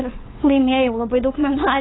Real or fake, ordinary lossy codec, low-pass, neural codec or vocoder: fake; AAC, 16 kbps; 7.2 kHz; codec, 16 kHz, 8 kbps, FunCodec, trained on LibriTTS, 25 frames a second